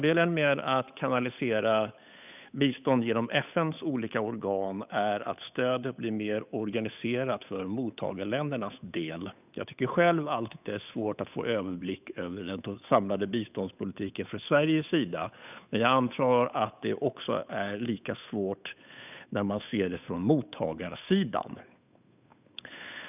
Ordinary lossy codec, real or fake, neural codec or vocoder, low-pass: none; fake; codec, 16 kHz, 8 kbps, FunCodec, trained on Chinese and English, 25 frames a second; 3.6 kHz